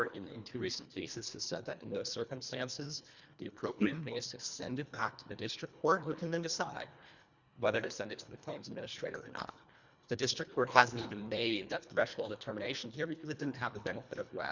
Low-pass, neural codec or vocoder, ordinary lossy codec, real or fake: 7.2 kHz; codec, 24 kHz, 1.5 kbps, HILCodec; Opus, 64 kbps; fake